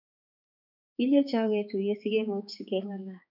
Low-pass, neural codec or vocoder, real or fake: 5.4 kHz; codec, 16 kHz, 4 kbps, X-Codec, HuBERT features, trained on balanced general audio; fake